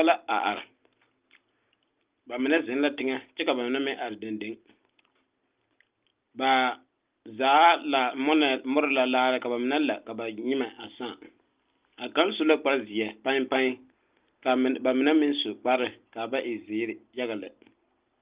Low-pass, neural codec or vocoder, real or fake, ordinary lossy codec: 3.6 kHz; none; real; Opus, 32 kbps